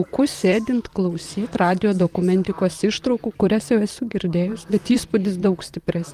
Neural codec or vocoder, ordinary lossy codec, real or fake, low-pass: vocoder, 44.1 kHz, 128 mel bands, Pupu-Vocoder; Opus, 32 kbps; fake; 14.4 kHz